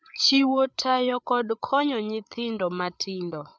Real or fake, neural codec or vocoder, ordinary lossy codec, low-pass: fake; codec, 16 kHz, 8 kbps, FreqCodec, larger model; none; none